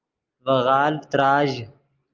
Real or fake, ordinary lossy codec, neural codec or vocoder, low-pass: real; Opus, 24 kbps; none; 7.2 kHz